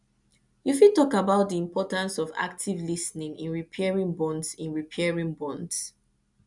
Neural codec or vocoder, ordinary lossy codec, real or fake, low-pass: none; none; real; 10.8 kHz